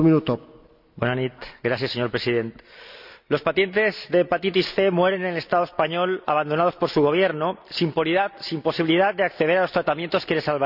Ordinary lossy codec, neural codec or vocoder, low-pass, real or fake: none; none; 5.4 kHz; real